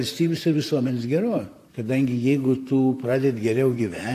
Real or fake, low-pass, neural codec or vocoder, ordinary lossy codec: fake; 14.4 kHz; vocoder, 44.1 kHz, 128 mel bands, Pupu-Vocoder; AAC, 64 kbps